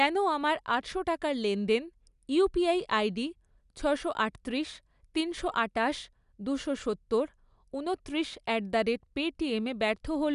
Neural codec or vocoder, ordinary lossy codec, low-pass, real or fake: none; none; 10.8 kHz; real